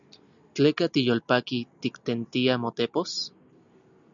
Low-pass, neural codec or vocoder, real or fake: 7.2 kHz; none; real